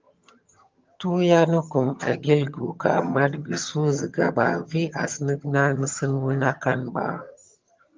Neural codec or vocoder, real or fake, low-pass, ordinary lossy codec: vocoder, 22.05 kHz, 80 mel bands, HiFi-GAN; fake; 7.2 kHz; Opus, 32 kbps